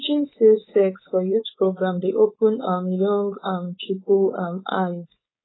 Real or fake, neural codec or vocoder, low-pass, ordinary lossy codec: fake; codec, 16 kHz, 4.8 kbps, FACodec; 7.2 kHz; AAC, 16 kbps